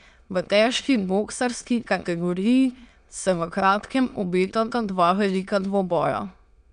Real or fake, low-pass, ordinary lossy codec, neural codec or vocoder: fake; 9.9 kHz; none; autoencoder, 22.05 kHz, a latent of 192 numbers a frame, VITS, trained on many speakers